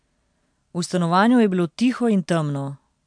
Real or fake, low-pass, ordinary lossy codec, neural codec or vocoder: real; 9.9 kHz; MP3, 64 kbps; none